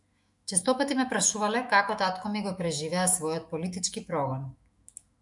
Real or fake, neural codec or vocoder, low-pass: fake; autoencoder, 48 kHz, 128 numbers a frame, DAC-VAE, trained on Japanese speech; 10.8 kHz